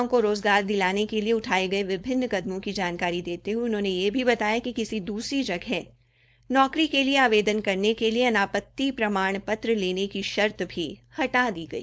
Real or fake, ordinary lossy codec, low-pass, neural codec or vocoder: fake; none; none; codec, 16 kHz, 4.8 kbps, FACodec